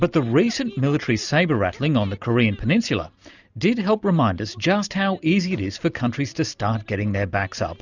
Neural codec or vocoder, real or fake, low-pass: none; real; 7.2 kHz